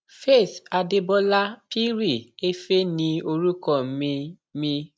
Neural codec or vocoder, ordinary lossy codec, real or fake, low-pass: none; none; real; none